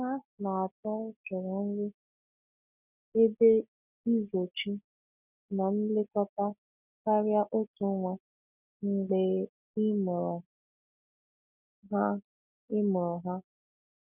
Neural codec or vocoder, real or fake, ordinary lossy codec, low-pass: none; real; none; 3.6 kHz